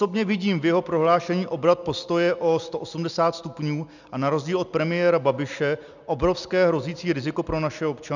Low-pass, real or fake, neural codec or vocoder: 7.2 kHz; real; none